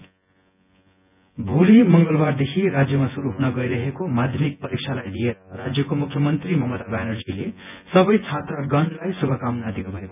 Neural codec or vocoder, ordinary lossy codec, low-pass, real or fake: vocoder, 24 kHz, 100 mel bands, Vocos; none; 3.6 kHz; fake